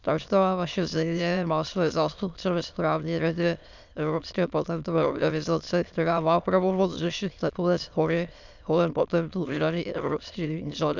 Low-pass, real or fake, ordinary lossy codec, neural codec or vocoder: 7.2 kHz; fake; none; autoencoder, 22.05 kHz, a latent of 192 numbers a frame, VITS, trained on many speakers